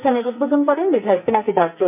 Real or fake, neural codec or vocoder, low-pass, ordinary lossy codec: fake; codec, 44.1 kHz, 2.6 kbps, SNAC; 3.6 kHz; none